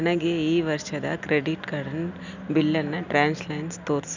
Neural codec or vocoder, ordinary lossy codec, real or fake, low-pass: none; none; real; 7.2 kHz